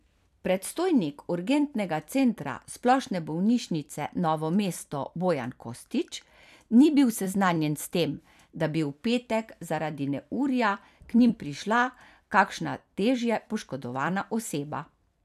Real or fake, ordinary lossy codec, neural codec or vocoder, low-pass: fake; none; vocoder, 44.1 kHz, 128 mel bands every 256 samples, BigVGAN v2; 14.4 kHz